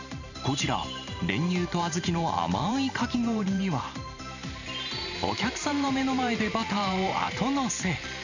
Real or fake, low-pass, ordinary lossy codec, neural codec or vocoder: fake; 7.2 kHz; none; vocoder, 44.1 kHz, 128 mel bands every 512 samples, BigVGAN v2